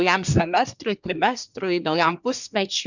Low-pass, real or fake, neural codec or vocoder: 7.2 kHz; fake; codec, 24 kHz, 1 kbps, SNAC